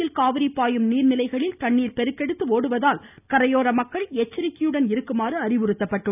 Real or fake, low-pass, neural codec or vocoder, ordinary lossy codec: real; 3.6 kHz; none; none